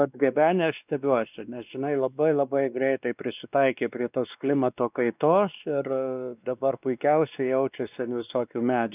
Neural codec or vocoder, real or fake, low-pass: codec, 16 kHz, 2 kbps, X-Codec, WavLM features, trained on Multilingual LibriSpeech; fake; 3.6 kHz